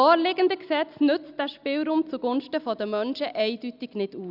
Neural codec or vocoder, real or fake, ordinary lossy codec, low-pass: none; real; Opus, 64 kbps; 5.4 kHz